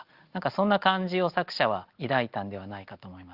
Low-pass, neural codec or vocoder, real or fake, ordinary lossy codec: 5.4 kHz; none; real; Opus, 32 kbps